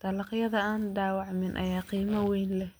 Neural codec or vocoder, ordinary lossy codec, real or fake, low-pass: none; none; real; none